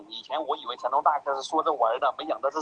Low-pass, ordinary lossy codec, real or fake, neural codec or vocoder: 9.9 kHz; Opus, 16 kbps; real; none